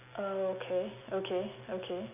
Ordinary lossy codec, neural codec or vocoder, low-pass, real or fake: none; none; 3.6 kHz; real